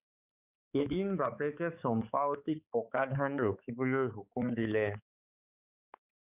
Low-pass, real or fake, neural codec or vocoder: 3.6 kHz; fake; codec, 16 kHz, 4 kbps, X-Codec, HuBERT features, trained on balanced general audio